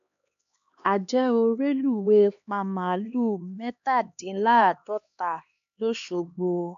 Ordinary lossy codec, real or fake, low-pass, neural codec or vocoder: AAC, 96 kbps; fake; 7.2 kHz; codec, 16 kHz, 2 kbps, X-Codec, HuBERT features, trained on LibriSpeech